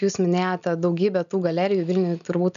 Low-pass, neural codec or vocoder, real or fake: 7.2 kHz; none; real